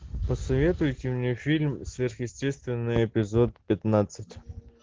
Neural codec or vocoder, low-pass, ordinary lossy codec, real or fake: none; 7.2 kHz; Opus, 16 kbps; real